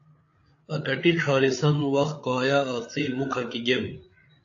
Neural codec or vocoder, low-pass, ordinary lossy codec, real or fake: codec, 16 kHz, 8 kbps, FreqCodec, larger model; 7.2 kHz; AAC, 48 kbps; fake